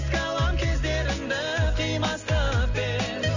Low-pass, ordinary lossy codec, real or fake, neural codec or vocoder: 7.2 kHz; none; real; none